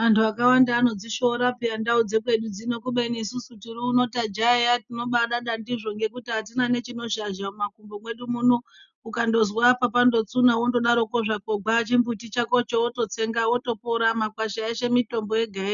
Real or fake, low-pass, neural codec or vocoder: real; 7.2 kHz; none